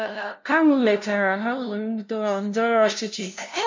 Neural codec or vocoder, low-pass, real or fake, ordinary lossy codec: codec, 16 kHz, 0.5 kbps, FunCodec, trained on LibriTTS, 25 frames a second; 7.2 kHz; fake; MP3, 64 kbps